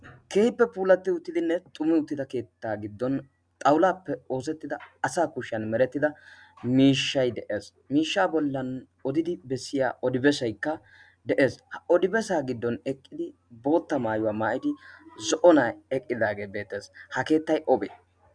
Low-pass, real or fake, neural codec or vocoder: 9.9 kHz; real; none